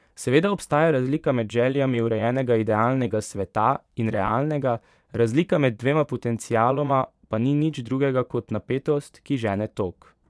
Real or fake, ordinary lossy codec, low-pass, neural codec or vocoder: fake; none; none; vocoder, 22.05 kHz, 80 mel bands, Vocos